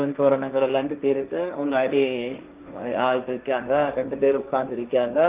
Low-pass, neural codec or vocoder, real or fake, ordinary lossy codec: 3.6 kHz; codec, 16 kHz, 1.1 kbps, Voila-Tokenizer; fake; Opus, 32 kbps